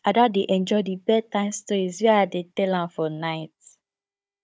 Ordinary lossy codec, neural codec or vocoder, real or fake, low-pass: none; codec, 16 kHz, 16 kbps, FunCodec, trained on Chinese and English, 50 frames a second; fake; none